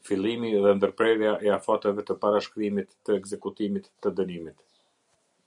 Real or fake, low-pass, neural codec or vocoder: real; 10.8 kHz; none